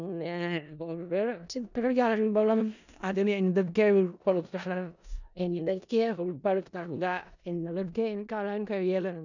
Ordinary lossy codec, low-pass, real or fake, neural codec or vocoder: none; 7.2 kHz; fake; codec, 16 kHz in and 24 kHz out, 0.4 kbps, LongCat-Audio-Codec, four codebook decoder